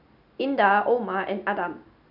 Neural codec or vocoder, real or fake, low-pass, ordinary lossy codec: none; real; 5.4 kHz; none